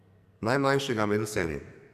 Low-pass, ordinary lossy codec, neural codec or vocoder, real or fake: 14.4 kHz; none; codec, 44.1 kHz, 2.6 kbps, SNAC; fake